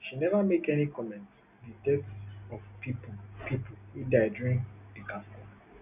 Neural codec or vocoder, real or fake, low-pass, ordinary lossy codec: none; real; 3.6 kHz; none